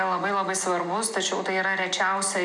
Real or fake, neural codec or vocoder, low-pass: real; none; 10.8 kHz